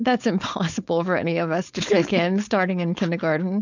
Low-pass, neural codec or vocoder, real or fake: 7.2 kHz; codec, 16 kHz, 4.8 kbps, FACodec; fake